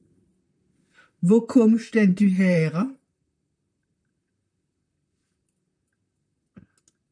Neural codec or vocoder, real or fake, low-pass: vocoder, 44.1 kHz, 128 mel bands, Pupu-Vocoder; fake; 9.9 kHz